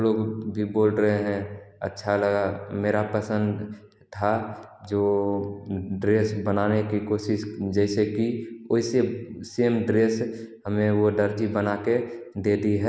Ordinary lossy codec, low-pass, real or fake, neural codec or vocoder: none; none; real; none